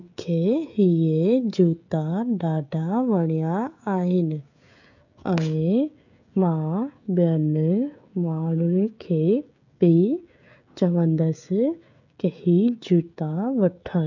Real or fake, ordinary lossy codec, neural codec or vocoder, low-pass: fake; none; codec, 16 kHz, 16 kbps, FreqCodec, smaller model; 7.2 kHz